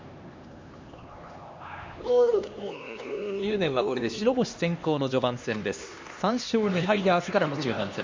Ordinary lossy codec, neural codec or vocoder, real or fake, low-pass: MP3, 48 kbps; codec, 16 kHz, 2 kbps, X-Codec, HuBERT features, trained on LibriSpeech; fake; 7.2 kHz